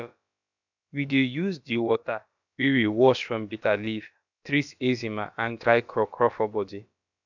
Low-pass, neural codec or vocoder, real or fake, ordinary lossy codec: 7.2 kHz; codec, 16 kHz, about 1 kbps, DyCAST, with the encoder's durations; fake; none